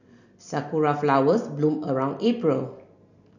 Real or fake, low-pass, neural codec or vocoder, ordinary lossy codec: real; 7.2 kHz; none; none